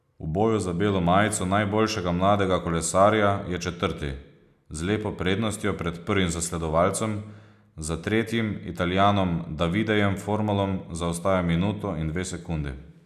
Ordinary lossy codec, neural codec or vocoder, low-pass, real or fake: none; none; 14.4 kHz; real